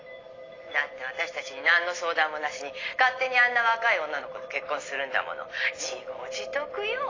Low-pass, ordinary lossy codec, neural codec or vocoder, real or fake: 7.2 kHz; AAC, 32 kbps; none; real